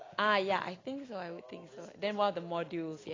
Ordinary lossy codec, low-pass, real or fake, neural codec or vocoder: AAC, 32 kbps; 7.2 kHz; real; none